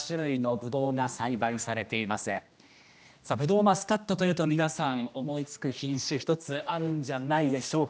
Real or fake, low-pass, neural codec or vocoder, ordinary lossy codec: fake; none; codec, 16 kHz, 1 kbps, X-Codec, HuBERT features, trained on general audio; none